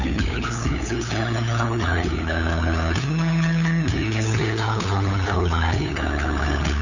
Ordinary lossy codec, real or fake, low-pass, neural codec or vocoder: none; fake; 7.2 kHz; codec, 16 kHz, 8 kbps, FunCodec, trained on LibriTTS, 25 frames a second